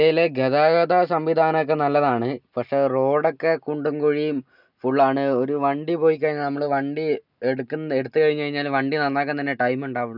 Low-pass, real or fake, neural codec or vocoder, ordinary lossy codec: 5.4 kHz; real; none; none